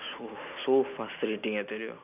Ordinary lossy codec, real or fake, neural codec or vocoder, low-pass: none; real; none; 3.6 kHz